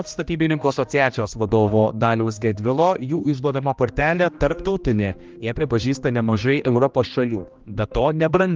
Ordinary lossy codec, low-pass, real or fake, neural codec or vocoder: Opus, 32 kbps; 7.2 kHz; fake; codec, 16 kHz, 1 kbps, X-Codec, HuBERT features, trained on general audio